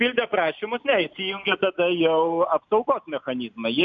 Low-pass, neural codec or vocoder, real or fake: 7.2 kHz; none; real